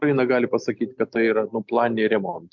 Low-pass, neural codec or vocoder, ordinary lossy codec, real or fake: 7.2 kHz; none; MP3, 64 kbps; real